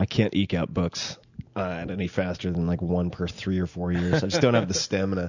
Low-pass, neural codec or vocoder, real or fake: 7.2 kHz; none; real